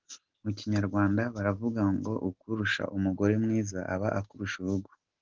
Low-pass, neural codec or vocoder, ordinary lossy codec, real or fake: 7.2 kHz; none; Opus, 16 kbps; real